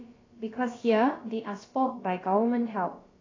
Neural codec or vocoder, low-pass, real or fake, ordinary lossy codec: codec, 16 kHz, about 1 kbps, DyCAST, with the encoder's durations; 7.2 kHz; fake; AAC, 32 kbps